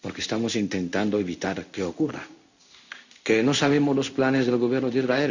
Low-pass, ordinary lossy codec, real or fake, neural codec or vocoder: 7.2 kHz; none; fake; codec, 16 kHz in and 24 kHz out, 1 kbps, XY-Tokenizer